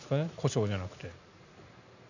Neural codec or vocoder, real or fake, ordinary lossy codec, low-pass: none; real; none; 7.2 kHz